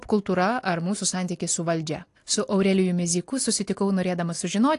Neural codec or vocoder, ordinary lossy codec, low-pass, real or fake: none; AAC, 48 kbps; 10.8 kHz; real